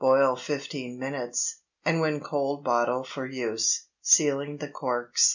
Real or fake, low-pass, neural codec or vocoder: real; 7.2 kHz; none